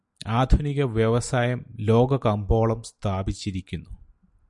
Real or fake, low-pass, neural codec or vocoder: real; 10.8 kHz; none